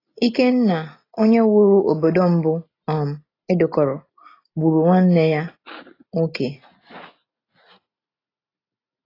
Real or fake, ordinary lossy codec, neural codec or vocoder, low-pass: real; AAC, 24 kbps; none; 5.4 kHz